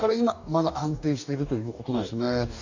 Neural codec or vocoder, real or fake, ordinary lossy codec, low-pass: codec, 44.1 kHz, 2.6 kbps, DAC; fake; none; 7.2 kHz